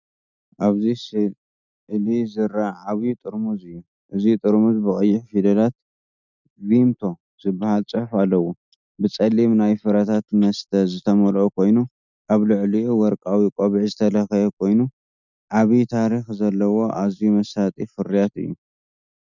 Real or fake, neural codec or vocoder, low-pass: real; none; 7.2 kHz